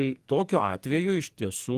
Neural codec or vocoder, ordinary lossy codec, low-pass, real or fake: codec, 44.1 kHz, 2.6 kbps, SNAC; Opus, 24 kbps; 14.4 kHz; fake